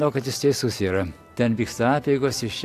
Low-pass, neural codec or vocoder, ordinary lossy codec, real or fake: 14.4 kHz; none; AAC, 64 kbps; real